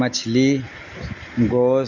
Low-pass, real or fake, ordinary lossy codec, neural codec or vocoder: 7.2 kHz; real; none; none